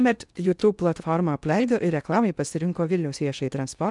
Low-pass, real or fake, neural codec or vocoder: 10.8 kHz; fake; codec, 16 kHz in and 24 kHz out, 0.6 kbps, FocalCodec, streaming, 2048 codes